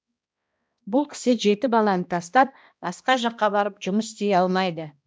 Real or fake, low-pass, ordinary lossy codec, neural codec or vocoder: fake; none; none; codec, 16 kHz, 1 kbps, X-Codec, HuBERT features, trained on balanced general audio